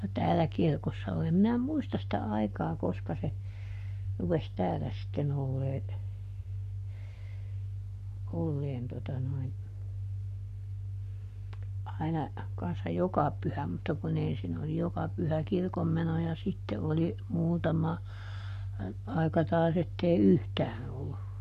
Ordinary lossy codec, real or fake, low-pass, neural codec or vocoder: none; fake; 14.4 kHz; codec, 44.1 kHz, 7.8 kbps, Pupu-Codec